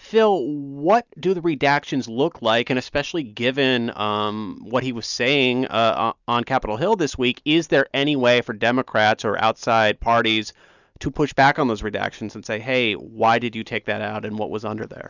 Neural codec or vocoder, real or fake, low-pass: none; real; 7.2 kHz